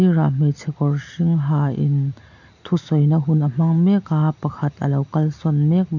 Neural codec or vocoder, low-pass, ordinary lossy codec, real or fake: none; 7.2 kHz; none; real